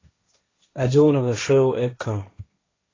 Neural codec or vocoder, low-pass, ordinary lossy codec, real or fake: codec, 16 kHz, 1.1 kbps, Voila-Tokenizer; 7.2 kHz; AAC, 32 kbps; fake